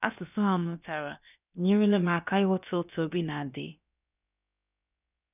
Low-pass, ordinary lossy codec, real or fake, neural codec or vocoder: 3.6 kHz; none; fake; codec, 16 kHz, about 1 kbps, DyCAST, with the encoder's durations